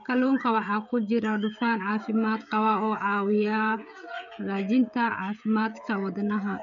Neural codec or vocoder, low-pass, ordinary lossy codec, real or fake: codec, 16 kHz, 16 kbps, FreqCodec, smaller model; 7.2 kHz; none; fake